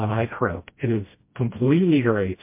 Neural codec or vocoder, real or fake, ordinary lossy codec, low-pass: codec, 16 kHz, 1 kbps, FreqCodec, smaller model; fake; MP3, 24 kbps; 3.6 kHz